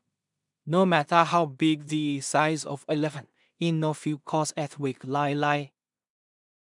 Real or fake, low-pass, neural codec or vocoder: fake; 10.8 kHz; codec, 16 kHz in and 24 kHz out, 0.4 kbps, LongCat-Audio-Codec, two codebook decoder